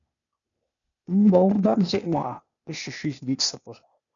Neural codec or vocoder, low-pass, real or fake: codec, 16 kHz, 0.8 kbps, ZipCodec; 7.2 kHz; fake